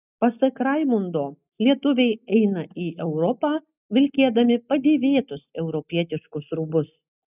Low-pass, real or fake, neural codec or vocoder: 3.6 kHz; real; none